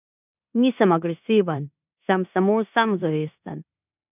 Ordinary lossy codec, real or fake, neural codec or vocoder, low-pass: none; fake; codec, 16 kHz in and 24 kHz out, 0.9 kbps, LongCat-Audio-Codec, four codebook decoder; 3.6 kHz